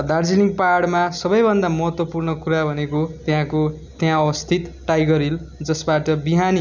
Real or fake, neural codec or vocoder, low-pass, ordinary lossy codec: real; none; 7.2 kHz; none